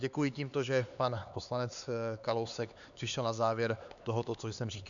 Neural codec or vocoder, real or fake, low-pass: codec, 16 kHz, 4 kbps, X-Codec, HuBERT features, trained on LibriSpeech; fake; 7.2 kHz